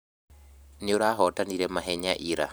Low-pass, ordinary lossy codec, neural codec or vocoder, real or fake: none; none; vocoder, 44.1 kHz, 128 mel bands every 512 samples, BigVGAN v2; fake